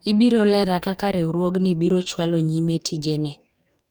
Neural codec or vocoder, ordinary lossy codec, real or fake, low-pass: codec, 44.1 kHz, 2.6 kbps, DAC; none; fake; none